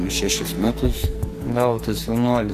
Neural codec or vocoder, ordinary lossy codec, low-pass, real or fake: codec, 44.1 kHz, 2.6 kbps, SNAC; AAC, 64 kbps; 14.4 kHz; fake